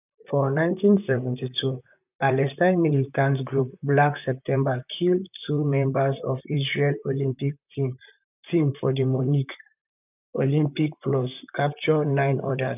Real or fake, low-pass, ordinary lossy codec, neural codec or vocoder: fake; 3.6 kHz; none; vocoder, 44.1 kHz, 128 mel bands, Pupu-Vocoder